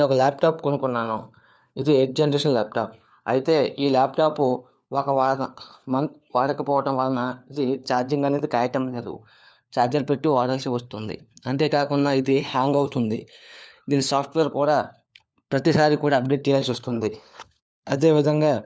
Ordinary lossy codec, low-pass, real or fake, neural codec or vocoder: none; none; fake; codec, 16 kHz, 4 kbps, FunCodec, trained on LibriTTS, 50 frames a second